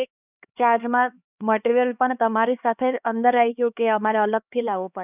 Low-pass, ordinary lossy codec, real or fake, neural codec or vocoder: 3.6 kHz; none; fake; codec, 16 kHz, 2 kbps, X-Codec, HuBERT features, trained on LibriSpeech